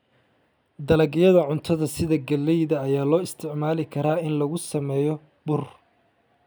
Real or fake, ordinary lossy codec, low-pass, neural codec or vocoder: real; none; none; none